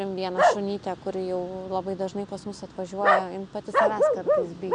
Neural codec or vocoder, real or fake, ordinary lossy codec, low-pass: none; real; MP3, 96 kbps; 9.9 kHz